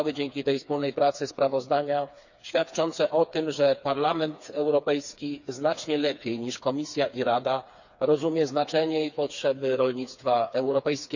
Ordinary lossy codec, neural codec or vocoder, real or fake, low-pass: none; codec, 16 kHz, 4 kbps, FreqCodec, smaller model; fake; 7.2 kHz